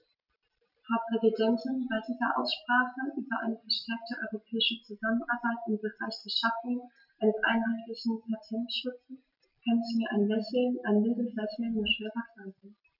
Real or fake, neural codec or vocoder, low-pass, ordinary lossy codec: real; none; 5.4 kHz; none